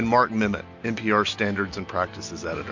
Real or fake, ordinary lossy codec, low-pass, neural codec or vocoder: real; MP3, 48 kbps; 7.2 kHz; none